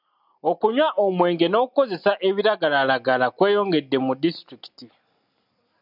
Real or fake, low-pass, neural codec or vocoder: real; 5.4 kHz; none